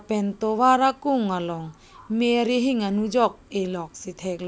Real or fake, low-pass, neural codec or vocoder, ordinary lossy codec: real; none; none; none